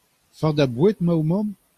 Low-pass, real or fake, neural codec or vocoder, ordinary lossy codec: 14.4 kHz; real; none; Opus, 64 kbps